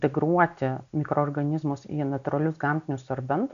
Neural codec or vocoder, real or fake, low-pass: none; real; 7.2 kHz